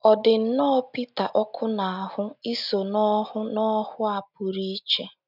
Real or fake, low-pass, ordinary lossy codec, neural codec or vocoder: real; 5.4 kHz; none; none